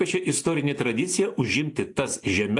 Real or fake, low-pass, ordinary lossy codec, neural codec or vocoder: real; 10.8 kHz; AAC, 48 kbps; none